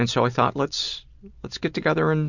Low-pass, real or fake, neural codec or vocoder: 7.2 kHz; real; none